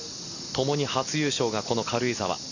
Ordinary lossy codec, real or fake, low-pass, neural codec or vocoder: none; real; 7.2 kHz; none